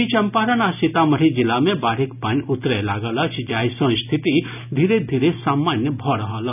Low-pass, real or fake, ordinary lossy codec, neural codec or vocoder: 3.6 kHz; real; none; none